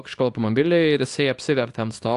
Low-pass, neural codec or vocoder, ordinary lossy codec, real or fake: 10.8 kHz; codec, 24 kHz, 0.9 kbps, WavTokenizer, small release; AAC, 64 kbps; fake